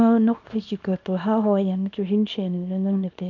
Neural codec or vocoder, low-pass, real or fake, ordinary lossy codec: codec, 24 kHz, 0.9 kbps, WavTokenizer, small release; 7.2 kHz; fake; AAC, 48 kbps